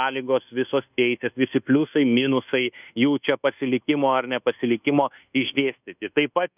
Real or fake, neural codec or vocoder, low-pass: fake; codec, 24 kHz, 1.2 kbps, DualCodec; 3.6 kHz